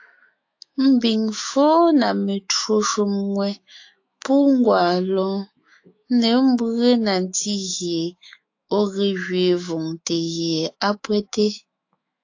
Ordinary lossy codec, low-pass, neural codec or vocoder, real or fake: AAC, 48 kbps; 7.2 kHz; autoencoder, 48 kHz, 128 numbers a frame, DAC-VAE, trained on Japanese speech; fake